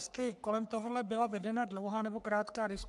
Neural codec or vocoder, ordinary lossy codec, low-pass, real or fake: codec, 44.1 kHz, 3.4 kbps, Pupu-Codec; MP3, 96 kbps; 10.8 kHz; fake